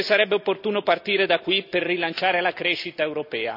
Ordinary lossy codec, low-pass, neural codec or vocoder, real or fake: none; 5.4 kHz; none; real